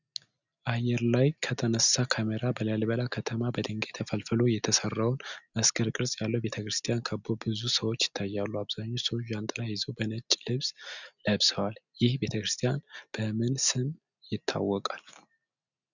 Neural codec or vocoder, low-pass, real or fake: none; 7.2 kHz; real